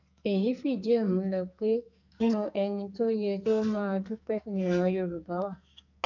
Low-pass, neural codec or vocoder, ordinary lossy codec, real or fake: 7.2 kHz; codec, 44.1 kHz, 2.6 kbps, SNAC; none; fake